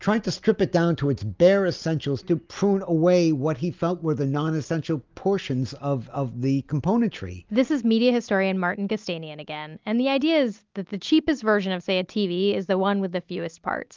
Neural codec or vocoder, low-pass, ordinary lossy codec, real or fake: none; 7.2 kHz; Opus, 24 kbps; real